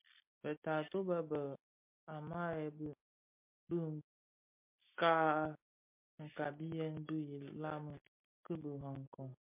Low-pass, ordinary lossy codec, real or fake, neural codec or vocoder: 3.6 kHz; MP3, 32 kbps; real; none